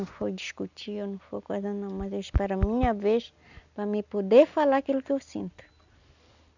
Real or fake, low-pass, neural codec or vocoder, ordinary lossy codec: real; 7.2 kHz; none; none